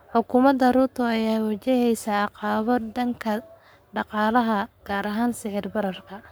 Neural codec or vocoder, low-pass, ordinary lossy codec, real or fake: codec, 44.1 kHz, 7.8 kbps, Pupu-Codec; none; none; fake